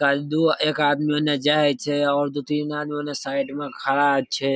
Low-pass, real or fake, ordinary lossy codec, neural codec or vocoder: none; real; none; none